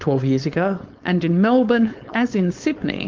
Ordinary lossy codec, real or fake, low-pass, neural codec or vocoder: Opus, 32 kbps; fake; 7.2 kHz; codec, 16 kHz, 4.8 kbps, FACodec